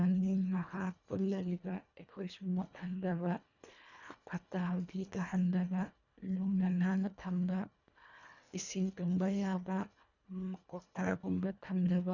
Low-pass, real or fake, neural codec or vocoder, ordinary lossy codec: 7.2 kHz; fake; codec, 24 kHz, 1.5 kbps, HILCodec; none